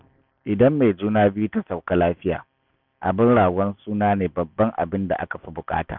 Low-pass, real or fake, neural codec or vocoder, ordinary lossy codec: 5.4 kHz; real; none; none